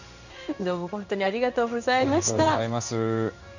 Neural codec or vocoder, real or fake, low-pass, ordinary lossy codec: codec, 16 kHz in and 24 kHz out, 1 kbps, XY-Tokenizer; fake; 7.2 kHz; none